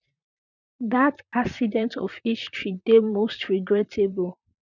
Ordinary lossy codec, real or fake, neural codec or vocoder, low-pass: none; fake; vocoder, 44.1 kHz, 128 mel bands, Pupu-Vocoder; 7.2 kHz